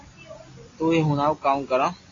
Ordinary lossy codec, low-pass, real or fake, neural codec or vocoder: AAC, 32 kbps; 7.2 kHz; real; none